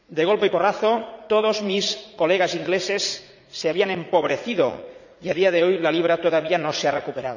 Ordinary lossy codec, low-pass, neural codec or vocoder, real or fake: none; 7.2 kHz; vocoder, 44.1 kHz, 80 mel bands, Vocos; fake